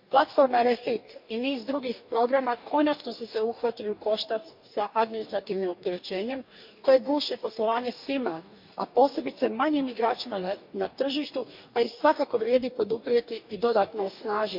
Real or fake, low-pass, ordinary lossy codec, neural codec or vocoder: fake; 5.4 kHz; MP3, 48 kbps; codec, 44.1 kHz, 2.6 kbps, DAC